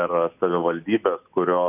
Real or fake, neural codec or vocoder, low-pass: real; none; 3.6 kHz